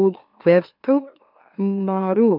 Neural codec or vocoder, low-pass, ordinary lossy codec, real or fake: autoencoder, 44.1 kHz, a latent of 192 numbers a frame, MeloTTS; 5.4 kHz; none; fake